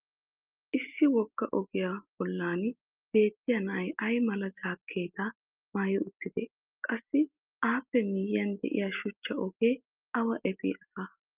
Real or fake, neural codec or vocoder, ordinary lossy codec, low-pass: real; none; Opus, 24 kbps; 3.6 kHz